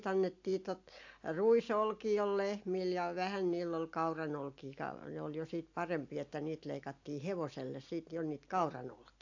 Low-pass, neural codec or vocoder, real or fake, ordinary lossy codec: 7.2 kHz; none; real; AAC, 48 kbps